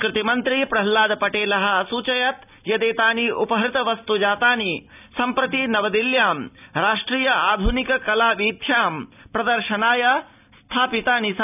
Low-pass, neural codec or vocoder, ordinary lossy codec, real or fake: 3.6 kHz; none; none; real